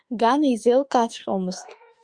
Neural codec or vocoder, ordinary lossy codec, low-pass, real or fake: autoencoder, 48 kHz, 32 numbers a frame, DAC-VAE, trained on Japanese speech; Opus, 64 kbps; 9.9 kHz; fake